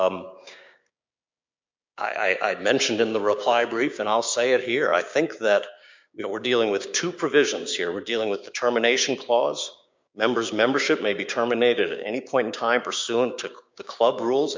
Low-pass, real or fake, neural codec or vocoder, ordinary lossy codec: 7.2 kHz; fake; codec, 16 kHz, 6 kbps, DAC; MP3, 64 kbps